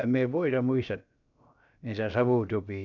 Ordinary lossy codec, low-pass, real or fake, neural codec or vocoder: none; 7.2 kHz; fake; codec, 16 kHz, about 1 kbps, DyCAST, with the encoder's durations